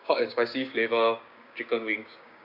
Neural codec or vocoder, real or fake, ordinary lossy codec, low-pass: codec, 16 kHz, 6 kbps, DAC; fake; none; 5.4 kHz